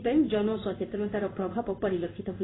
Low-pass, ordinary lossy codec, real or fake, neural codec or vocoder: 7.2 kHz; AAC, 16 kbps; fake; codec, 16 kHz in and 24 kHz out, 1 kbps, XY-Tokenizer